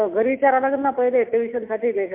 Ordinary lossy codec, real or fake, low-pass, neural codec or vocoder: MP3, 32 kbps; real; 3.6 kHz; none